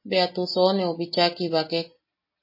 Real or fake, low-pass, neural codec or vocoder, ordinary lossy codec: real; 5.4 kHz; none; MP3, 24 kbps